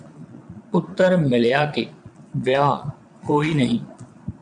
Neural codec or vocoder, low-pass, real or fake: vocoder, 22.05 kHz, 80 mel bands, Vocos; 9.9 kHz; fake